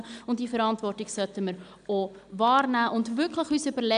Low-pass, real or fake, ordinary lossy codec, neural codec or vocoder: 9.9 kHz; real; none; none